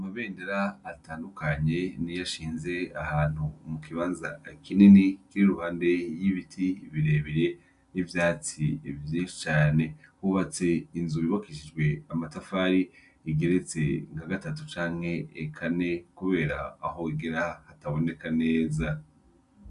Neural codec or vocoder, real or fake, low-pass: none; real; 10.8 kHz